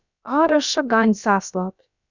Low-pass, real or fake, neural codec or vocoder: 7.2 kHz; fake; codec, 16 kHz, about 1 kbps, DyCAST, with the encoder's durations